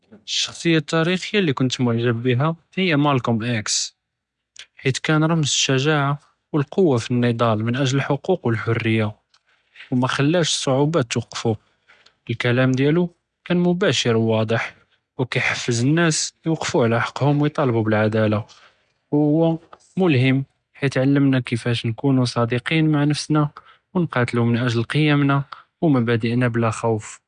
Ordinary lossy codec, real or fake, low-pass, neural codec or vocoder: none; real; 9.9 kHz; none